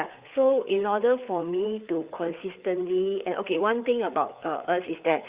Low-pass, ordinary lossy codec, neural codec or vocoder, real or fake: 3.6 kHz; Opus, 64 kbps; codec, 16 kHz, 4 kbps, FreqCodec, larger model; fake